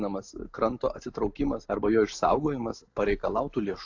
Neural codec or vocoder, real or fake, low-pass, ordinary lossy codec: none; real; 7.2 kHz; AAC, 48 kbps